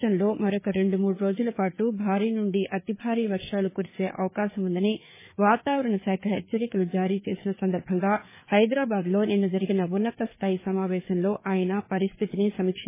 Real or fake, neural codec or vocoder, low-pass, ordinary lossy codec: fake; codec, 16 kHz, 4 kbps, FreqCodec, larger model; 3.6 kHz; MP3, 16 kbps